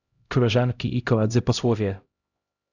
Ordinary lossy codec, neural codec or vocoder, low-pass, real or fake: Opus, 64 kbps; codec, 16 kHz, 0.5 kbps, X-Codec, HuBERT features, trained on LibriSpeech; 7.2 kHz; fake